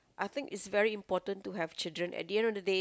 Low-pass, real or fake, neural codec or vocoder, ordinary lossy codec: none; real; none; none